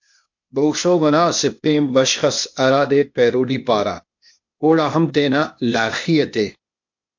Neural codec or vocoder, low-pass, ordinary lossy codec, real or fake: codec, 16 kHz, 0.8 kbps, ZipCodec; 7.2 kHz; MP3, 48 kbps; fake